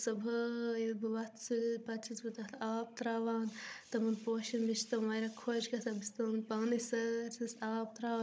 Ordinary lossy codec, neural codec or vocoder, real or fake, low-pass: none; codec, 16 kHz, 16 kbps, FunCodec, trained on Chinese and English, 50 frames a second; fake; none